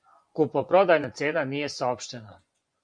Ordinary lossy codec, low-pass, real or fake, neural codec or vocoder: MP3, 64 kbps; 9.9 kHz; fake; vocoder, 24 kHz, 100 mel bands, Vocos